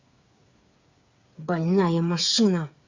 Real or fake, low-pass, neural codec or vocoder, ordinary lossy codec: fake; 7.2 kHz; codec, 16 kHz, 8 kbps, FreqCodec, smaller model; Opus, 64 kbps